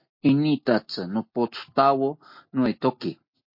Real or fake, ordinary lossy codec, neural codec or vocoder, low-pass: real; MP3, 32 kbps; none; 5.4 kHz